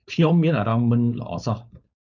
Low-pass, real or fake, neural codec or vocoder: 7.2 kHz; fake; codec, 16 kHz, 4.8 kbps, FACodec